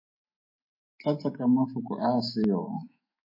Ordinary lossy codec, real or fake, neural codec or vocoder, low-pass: MP3, 24 kbps; fake; autoencoder, 48 kHz, 128 numbers a frame, DAC-VAE, trained on Japanese speech; 5.4 kHz